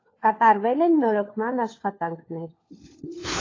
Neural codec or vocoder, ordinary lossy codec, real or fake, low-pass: codec, 16 kHz, 4 kbps, FunCodec, trained on LibriTTS, 50 frames a second; AAC, 32 kbps; fake; 7.2 kHz